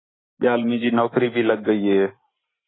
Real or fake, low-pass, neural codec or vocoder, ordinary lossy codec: real; 7.2 kHz; none; AAC, 16 kbps